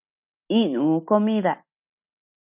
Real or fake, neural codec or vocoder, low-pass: real; none; 3.6 kHz